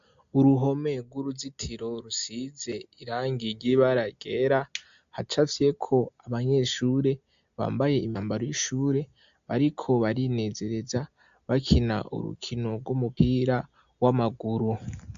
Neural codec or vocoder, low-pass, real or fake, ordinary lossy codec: none; 7.2 kHz; real; AAC, 64 kbps